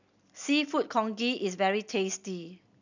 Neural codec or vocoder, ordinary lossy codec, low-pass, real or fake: none; none; 7.2 kHz; real